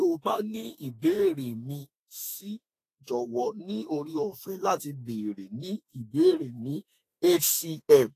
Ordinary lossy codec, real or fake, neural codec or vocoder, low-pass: AAC, 48 kbps; fake; autoencoder, 48 kHz, 32 numbers a frame, DAC-VAE, trained on Japanese speech; 14.4 kHz